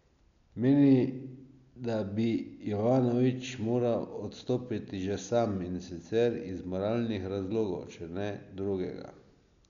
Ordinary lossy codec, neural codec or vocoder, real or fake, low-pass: none; none; real; 7.2 kHz